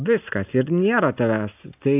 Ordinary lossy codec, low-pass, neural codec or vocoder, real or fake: AAC, 32 kbps; 3.6 kHz; none; real